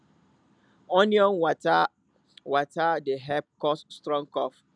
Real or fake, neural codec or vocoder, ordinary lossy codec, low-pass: real; none; none; 9.9 kHz